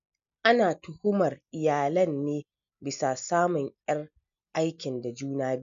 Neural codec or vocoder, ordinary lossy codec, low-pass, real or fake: none; none; 7.2 kHz; real